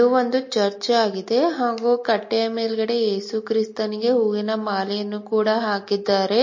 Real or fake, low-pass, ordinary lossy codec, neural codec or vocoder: real; 7.2 kHz; MP3, 32 kbps; none